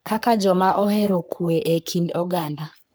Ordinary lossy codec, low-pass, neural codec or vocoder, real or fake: none; none; codec, 44.1 kHz, 3.4 kbps, Pupu-Codec; fake